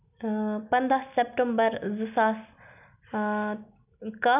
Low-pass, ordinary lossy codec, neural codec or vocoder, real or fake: 3.6 kHz; none; none; real